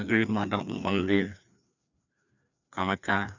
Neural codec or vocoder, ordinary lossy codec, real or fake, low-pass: codec, 16 kHz, 2 kbps, FreqCodec, larger model; none; fake; 7.2 kHz